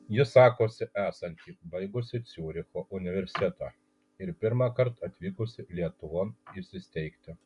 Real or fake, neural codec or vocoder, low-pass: real; none; 10.8 kHz